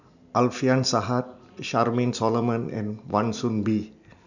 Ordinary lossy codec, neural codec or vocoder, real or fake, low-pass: none; none; real; 7.2 kHz